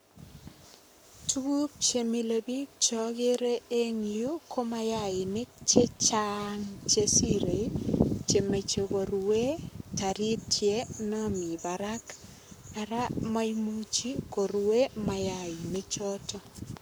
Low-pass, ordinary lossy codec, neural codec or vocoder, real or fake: none; none; codec, 44.1 kHz, 7.8 kbps, Pupu-Codec; fake